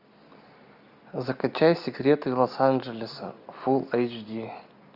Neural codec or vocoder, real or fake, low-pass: none; real; 5.4 kHz